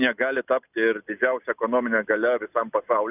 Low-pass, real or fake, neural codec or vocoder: 3.6 kHz; real; none